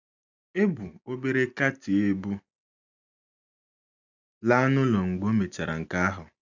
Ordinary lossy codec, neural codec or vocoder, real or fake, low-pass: none; none; real; 7.2 kHz